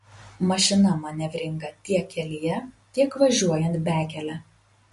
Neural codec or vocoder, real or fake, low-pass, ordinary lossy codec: none; real; 14.4 kHz; MP3, 48 kbps